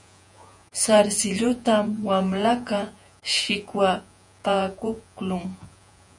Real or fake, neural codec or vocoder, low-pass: fake; vocoder, 48 kHz, 128 mel bands, Vocos; 10.8 kHz